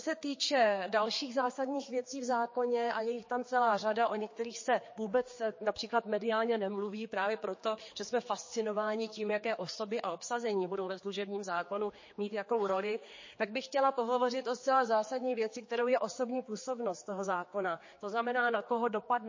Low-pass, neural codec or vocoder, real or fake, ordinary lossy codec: 7.2 kHz; codec, 16 kHz, 4 kbps, X-Codec, HuBERT features, trained on general audio; fake; MP3, 32 kbps